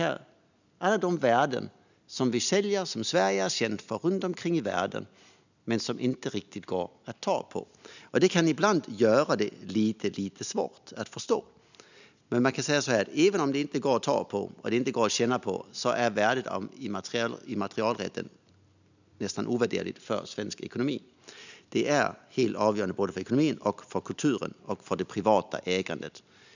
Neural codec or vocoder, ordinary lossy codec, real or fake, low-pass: none; none; real; 7.2 kHz